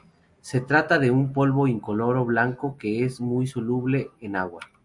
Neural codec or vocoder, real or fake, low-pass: none; real; 10.8 kHz